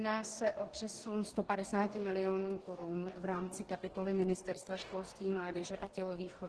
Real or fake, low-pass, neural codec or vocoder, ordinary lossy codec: fake; 10.8 kHz; codec, 44.1 kHz, 2.6 kbps, DAC; Opus, 16 kbps